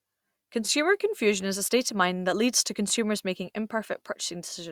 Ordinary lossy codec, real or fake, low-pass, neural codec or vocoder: Opus, 64 kbps; real; 19.8 kHz; none